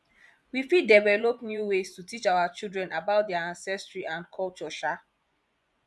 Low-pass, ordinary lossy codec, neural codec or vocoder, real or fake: none; none; vocoder, 24 kHz, 100 mel bands, Vocos; fake